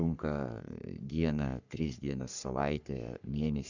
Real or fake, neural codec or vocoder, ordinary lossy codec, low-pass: fake; codec, 44.1 kHz, 3.4 kbps, Pupu-Codec; Opus, 64 kbps; 7.2 kHz